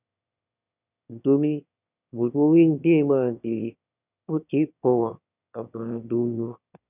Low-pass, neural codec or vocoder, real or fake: 3.6 kHz; autoencoder, 22.05 kHz, a latent of 192 numbers a frame, VITS, trained on one speaker; fake